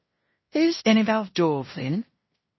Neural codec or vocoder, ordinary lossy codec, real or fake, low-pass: codec, 16 kHz in and 24 kHz out, 0.9 kbps, LongCat-Audio-Codec, fine tuned four codebook decoder; MP3, 24 kbps; fake; 7.2 kHz